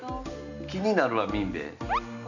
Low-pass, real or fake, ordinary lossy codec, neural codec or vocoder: 7.2 kHz; real; none; none